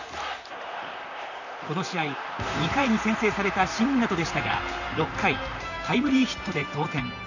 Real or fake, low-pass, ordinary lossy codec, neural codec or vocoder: fake; 7.2 kHz; none; vocoder, 44.1 kHz, 128 mel bands, Pupu-Vocoder